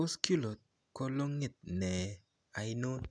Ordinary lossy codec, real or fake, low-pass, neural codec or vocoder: none; real; 9.9 kHz; none